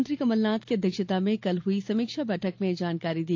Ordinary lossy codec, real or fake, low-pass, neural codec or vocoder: AAC, 48 kbps; real; 7.2 kHz; none